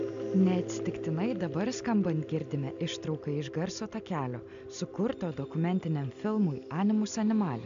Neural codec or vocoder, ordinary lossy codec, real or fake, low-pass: none; MP3, 64 kbps; real; 7.2 kHz